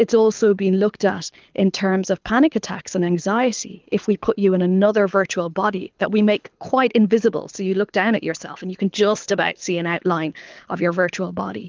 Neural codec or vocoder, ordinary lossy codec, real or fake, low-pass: codec, 24 kHz, 6 kbps, HILCodec; Opus, 24 kbps; fake; 7.2 kHz